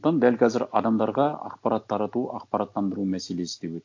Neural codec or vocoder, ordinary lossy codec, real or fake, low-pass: none; AAC, 48 kbps; real; 7.2 kHz